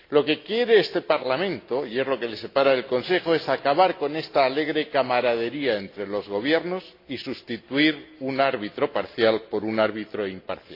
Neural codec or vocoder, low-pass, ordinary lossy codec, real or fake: none; 5.4 kHz; AAC, 32 kbps; real